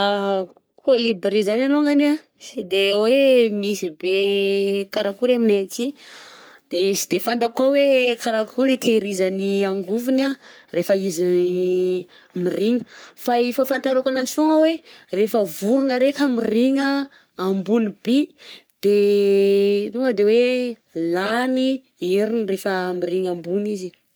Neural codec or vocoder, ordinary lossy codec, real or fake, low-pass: codec, 44.1 kHz, 3.4 kbps, Pupu-Codec; none; fake; none